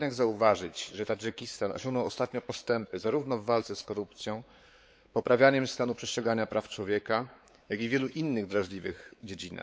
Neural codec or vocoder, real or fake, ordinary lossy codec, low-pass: codec, 16 kHz, 4 kbps, X-Codec, WavLM features, trained on Multilingual LibriSpeech; fake; none; none